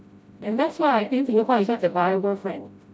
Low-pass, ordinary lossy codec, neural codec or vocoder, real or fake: none; none; codec, 16 kHz, 0.5 kbps, FreqCodec, smaller model; fake